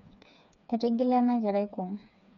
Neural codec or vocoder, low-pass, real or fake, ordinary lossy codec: codec, 16 kHz, 4 kbps, FreqCodec, smaller model; 7.2 kHz; fake; none